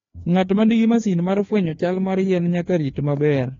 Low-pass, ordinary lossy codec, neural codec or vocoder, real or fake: 7.2 kHz; AAC, 32 kbps; codec, 16 kHz, 2 kbps, FreqCodec, larger model; fake